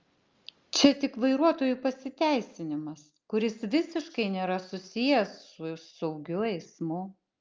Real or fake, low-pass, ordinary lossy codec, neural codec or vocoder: real; 7.2 kHz; Opus, 32 kbps; none